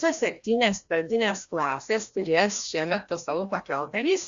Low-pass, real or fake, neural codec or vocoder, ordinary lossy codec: 7.2 kHz; fake; codec, 16 kHz, 1 kbps, FreqCodec, larger model; Opus, 64 kbps